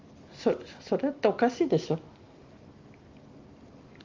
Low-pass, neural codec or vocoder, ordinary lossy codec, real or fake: 7.2 kHz; none; Opus, 32 kbps; real